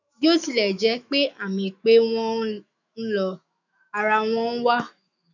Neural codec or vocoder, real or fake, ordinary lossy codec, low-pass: autoencoder, 48 kHz, 128 numbers a frame, DAC-VAE, trained on Japanese speech; fake; none; 7.2 kHz